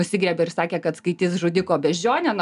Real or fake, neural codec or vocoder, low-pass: real; none; 10.8 kHz